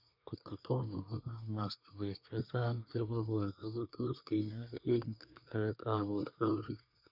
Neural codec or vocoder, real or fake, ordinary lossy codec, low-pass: codec, 24 kHz, 1 kbps, SNAC; fake; none; 5.4 kHz